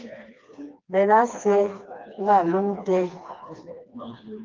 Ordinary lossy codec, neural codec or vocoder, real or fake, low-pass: Opus, 24 kbps; codec, 16 kHz, 2 kbps, FreqCodec, smaller model; fake; 7.2 kHz